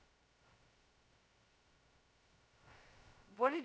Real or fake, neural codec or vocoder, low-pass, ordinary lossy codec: fake; codec, 16 kHz, 0.2 kbps, FocalCodec; none; none